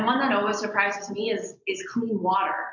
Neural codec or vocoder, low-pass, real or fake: none; 7.2 kHz; real